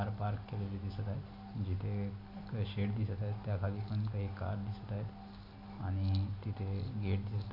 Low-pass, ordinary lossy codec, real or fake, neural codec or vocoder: 5.4 kHz; AAC, 32 kbps; real; none